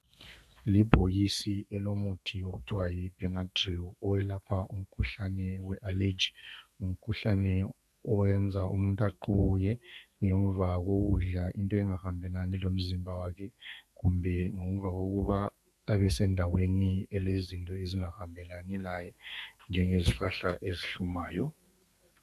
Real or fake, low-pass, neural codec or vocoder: fake; 14.4 kHz; codec, 32 kHz, 1.9 kbps, SNAC